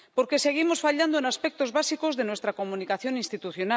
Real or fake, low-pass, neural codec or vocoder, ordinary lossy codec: real; none; none; none